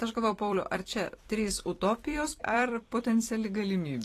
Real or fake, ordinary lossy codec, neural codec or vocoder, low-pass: fake; AAC, 48 kbps; vocoder, 44.1 kHz, 128 mel bands every 256 samples, BigVGAN v2; 14.4 kHz